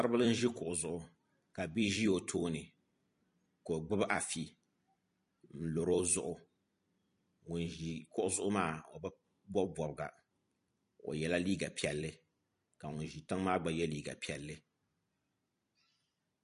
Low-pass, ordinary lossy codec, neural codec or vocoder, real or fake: 14.4 kHz; MP3, 48 kbps; vocoder, 44.1 kHz, 128 mel bands every 256 samples, BigVGAN v2; fake